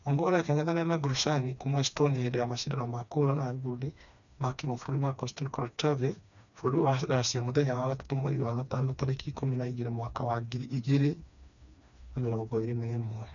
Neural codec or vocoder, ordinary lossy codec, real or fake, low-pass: codec, 16 kHz, 2 kbps, FreqCodec, smaller model; none; fake; 7.2 kHz